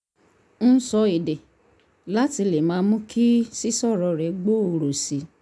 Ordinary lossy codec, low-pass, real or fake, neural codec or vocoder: none; none; real; none